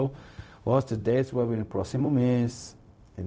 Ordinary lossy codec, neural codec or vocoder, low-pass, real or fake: none; codec, 16 kHz, 0.4 kbps, LongCat-Audio-Codec; none; fake